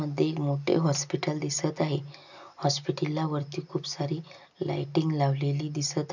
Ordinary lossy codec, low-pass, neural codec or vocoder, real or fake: none; 7.2 kHz; none; real